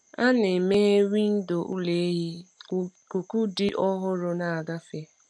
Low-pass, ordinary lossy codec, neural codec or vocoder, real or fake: none; none; none; real